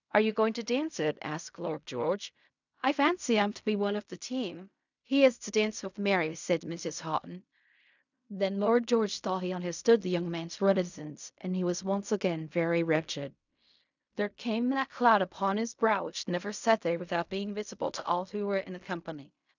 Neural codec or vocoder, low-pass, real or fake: codec, 16 kHz in and 24 kHz out, 0.4 kbps, LongCat-Audio-Codec, fine tuned four codebook decoder; 7.2 kHz; fake